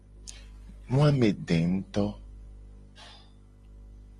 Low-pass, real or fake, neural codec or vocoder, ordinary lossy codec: 10.8 kHz; real; none; Opus, 32 kbps